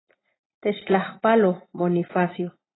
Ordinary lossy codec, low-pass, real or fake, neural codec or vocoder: AAC, 16 kbps; 7.2 kHz; real; none